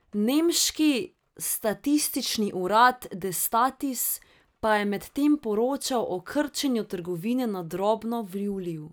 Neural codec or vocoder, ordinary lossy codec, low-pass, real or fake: none; none; none; real